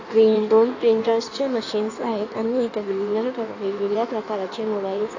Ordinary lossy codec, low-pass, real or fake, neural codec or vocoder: AAC, 48 kbps; 7.2 kHz; fake; codec, 16 kHz in and 24 kHz out, 1.1 kbps, FireRedTTS-2 codec